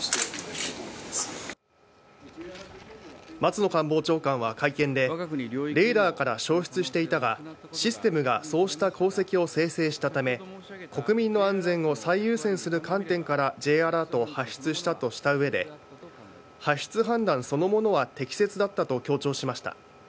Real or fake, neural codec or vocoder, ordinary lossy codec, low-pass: real; none; none; none